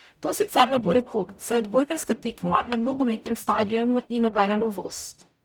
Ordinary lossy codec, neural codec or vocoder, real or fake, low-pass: none; codec, 44.1 kHz, 0.9 kbps, DAC; fake; none